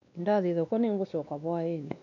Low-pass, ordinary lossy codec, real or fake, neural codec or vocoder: 7.2 kHz; none; fake; codec, 16 kHz, 2 kbps, X-Codec, WavLM features, trained on Multilingual LibriSpeech